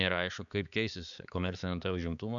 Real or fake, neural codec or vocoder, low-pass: fake; codec, 16 kHz, 4 kbps, X-Codec, HuBERT features, trained on balanced general audio; 7.2 kHz